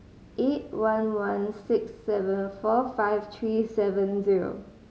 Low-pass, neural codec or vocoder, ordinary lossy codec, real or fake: none; none; none; real